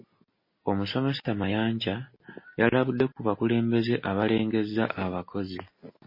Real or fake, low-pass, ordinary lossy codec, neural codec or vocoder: real; 5.4 kHz; MP3, 24 kbps; none